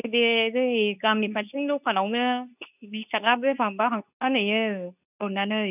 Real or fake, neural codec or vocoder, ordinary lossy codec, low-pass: fake; codec, 24 kHz, 0.9 kbps, WavTokenizer, medium speech release version 2; none; 3.6 kHz